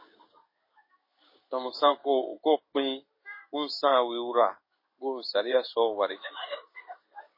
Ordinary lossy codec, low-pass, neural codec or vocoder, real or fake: MP3, 24 kbps; 5.4 kHz; codec, 16 kHz in and 24 kHz out, 1 kbps, XY-Tokenizer; fake